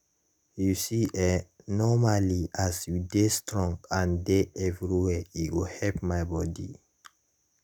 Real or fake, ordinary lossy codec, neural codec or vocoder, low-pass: real; none; none; none